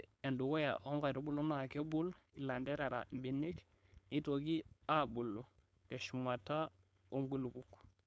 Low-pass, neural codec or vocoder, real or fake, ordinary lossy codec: none; codec, 16 kHz, 4.8 kbps, FACodec; fake; none